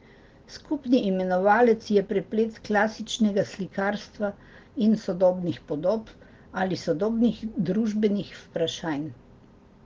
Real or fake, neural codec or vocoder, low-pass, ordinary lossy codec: real; none; 7.2 kHz; Opus, 16 kbps